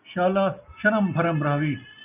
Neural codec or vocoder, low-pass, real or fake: none; 3.6 kHz; real